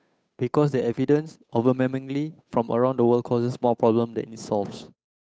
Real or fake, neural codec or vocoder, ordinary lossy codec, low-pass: fake; codec, 16 kHz, 8 kbps, FunCodec, trained on Chinese and English, 25 frames a second; none; none